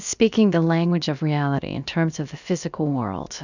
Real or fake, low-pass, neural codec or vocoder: fake; 7.2 kHz; codec, 16 kHz, about 1 kbps, DyCAST, with the encoder's durations